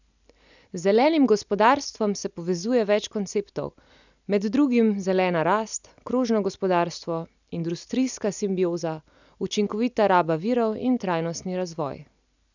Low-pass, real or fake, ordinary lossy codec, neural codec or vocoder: 7.2 kHz; real; none; none